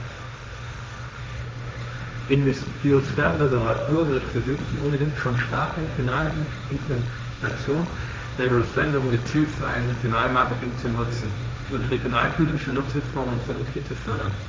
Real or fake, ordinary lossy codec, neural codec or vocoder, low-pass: fake; none; codec, 16 kHz, 1.1 kbps, Voila-Tokenizer; none